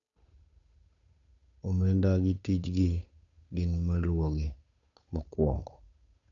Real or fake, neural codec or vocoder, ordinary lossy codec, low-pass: fake; codec, 16 kHz, 8 kbps, FunCodec, trained on Chinese and English, 25 frames a second; none; 7.2 kHz